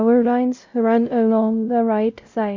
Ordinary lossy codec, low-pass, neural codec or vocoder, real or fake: MP3, 64 kbps; 7.2 kHz; codec, 16 kHz, 0.5 kbps, X-Codec, WavLM features, trained on Multilingual LibriSpeech; fake